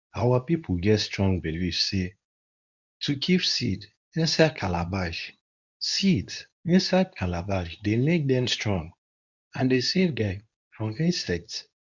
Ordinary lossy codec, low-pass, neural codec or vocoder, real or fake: none; 7.2 kHz; codec, 24 kHz, 0.9 kbps, WavTokenizer, medium speech release version 2; fake